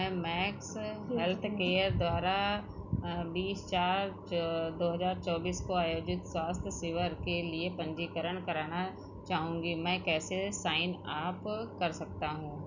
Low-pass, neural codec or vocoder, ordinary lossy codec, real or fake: 7.2 kHz; none; none; real